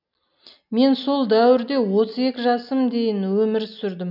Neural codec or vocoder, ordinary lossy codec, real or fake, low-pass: none; none; real; 5.4 kHz